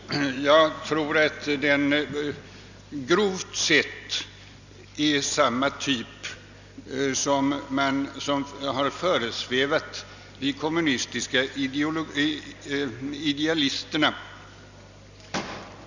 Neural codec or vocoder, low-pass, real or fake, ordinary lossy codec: none; 7.2 kHz; real; none